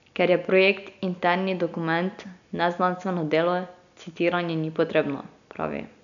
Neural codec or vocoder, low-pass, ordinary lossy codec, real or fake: none; 7.2 kHz; none; real